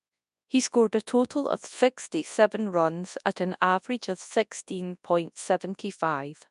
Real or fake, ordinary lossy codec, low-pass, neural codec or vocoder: fake; none; 10.8 kHz; codec, 24 kHz, 0.9 kbps, WavTokenizer, large speech release